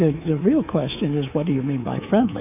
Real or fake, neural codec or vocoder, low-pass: fake; codec, 24 kHz, 3.1 kbps, DualCodec; 3.6 kHz